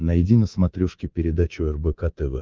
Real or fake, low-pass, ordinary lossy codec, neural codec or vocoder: fake; 7.2 kHz; Opus, 32 kbps; codec, 24 kHz, 3.1 kbps, DualCodec